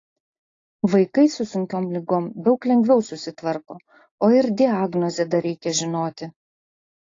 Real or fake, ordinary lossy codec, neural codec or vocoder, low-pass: real; AAC, 32 kbps; none; 7.2 kHz